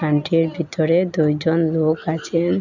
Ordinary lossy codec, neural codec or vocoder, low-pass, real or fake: none; none; 7.2 kHz; real